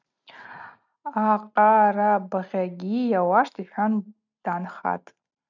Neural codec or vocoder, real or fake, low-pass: none; real; 7.2 kHz